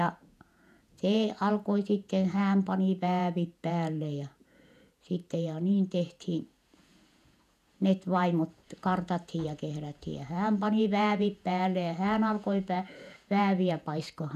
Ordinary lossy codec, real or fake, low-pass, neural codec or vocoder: none; fake; 14.4 kHz; vocoder, 48 kHz, 128 mel bands, Vocos